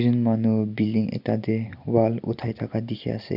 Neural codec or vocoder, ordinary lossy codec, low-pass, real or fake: none; none; 5.4 kHz; real